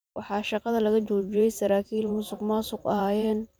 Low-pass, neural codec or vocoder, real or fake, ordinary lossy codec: none; vocoder, 44.1 kHz, 128 mel bands every 512 samples, BigVGAN v2; fake; none